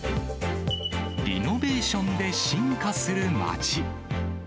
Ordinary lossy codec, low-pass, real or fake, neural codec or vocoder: none; none; real; none